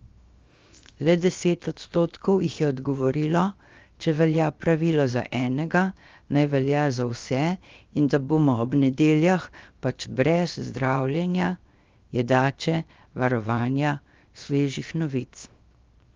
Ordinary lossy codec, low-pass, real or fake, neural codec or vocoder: Opus, 32 kbps; 7.2 kHz; fake; codec, 16 kHz, 0.7 kbps, FocalCodec